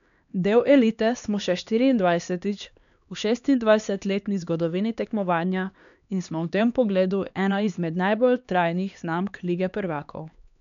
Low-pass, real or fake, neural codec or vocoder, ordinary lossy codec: 7.2 kHz; fake; codec, 16 kHz, 2 kbps, X-Codec, HuBERT features, trained on LibriSpeech; none